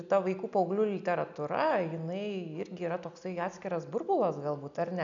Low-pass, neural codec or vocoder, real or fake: 7.2 kHz; none; real